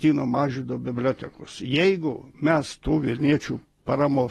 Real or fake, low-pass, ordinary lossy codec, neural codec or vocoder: real; 19.8 kHz; AAC, 32 kbps; none